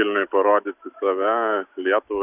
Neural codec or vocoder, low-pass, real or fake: none; 3.6 kHz; real